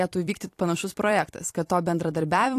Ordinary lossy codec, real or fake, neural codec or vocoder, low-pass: AAC, 64 kbps; fake; vocoder, 44.1 kHz, 128 mel bands every 256 samples, BigVGAN v2; 14.4 kHz